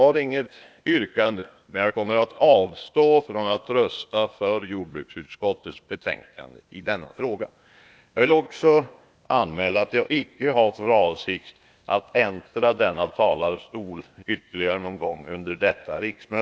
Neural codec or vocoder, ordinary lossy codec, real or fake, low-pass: codec, 16 kHz, 0.8 kbps, ZipCodec; none; fake; none